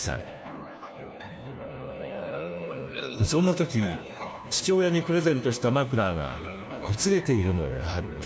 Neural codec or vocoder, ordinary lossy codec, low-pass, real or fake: codec, 16 kHz, 1 kbps, FunCodec, trained on LibriTTS, 50 frames a second; none; none; fake